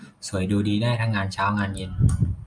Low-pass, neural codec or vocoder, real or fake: 9.9 kHz; none; real